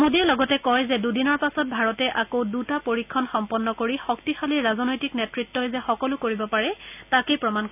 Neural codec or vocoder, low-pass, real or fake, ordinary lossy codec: none; 3.6 kHz; real; none